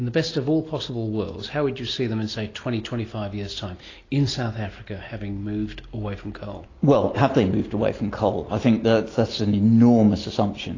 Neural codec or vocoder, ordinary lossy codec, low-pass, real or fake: none; AAC, 32 kbps; 7.2 kHz; real